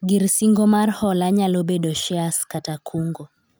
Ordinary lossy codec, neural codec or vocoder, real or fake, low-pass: none; none; real; none